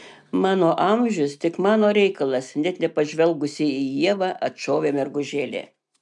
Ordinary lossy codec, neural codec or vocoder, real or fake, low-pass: MP3, 96 kbps; none; real; 10.8 kHz